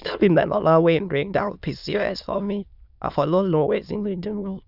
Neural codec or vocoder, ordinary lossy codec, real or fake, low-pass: autoencoder, 22.05 kHz, a latent of 192 numbers a frame, VITS, trained on many speakers; none; fake; 5.4 kHz